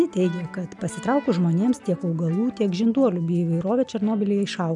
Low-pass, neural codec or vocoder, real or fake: 10.8 kHz; none; real